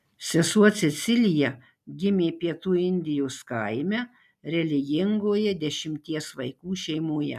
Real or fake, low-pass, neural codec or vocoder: real; 14.4 kHz; none